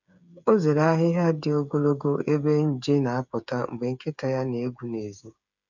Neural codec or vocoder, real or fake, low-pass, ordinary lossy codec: codec, 16 kHz, 8 kbps, FreqCodec, smaller model; fake; 7.2 kHz; none